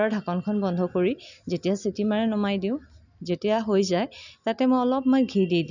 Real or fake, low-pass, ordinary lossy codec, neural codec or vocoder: real; 7.2 kHz; none; none